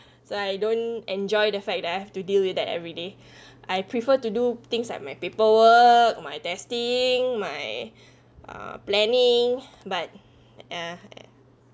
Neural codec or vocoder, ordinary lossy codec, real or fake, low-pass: none; none; real; none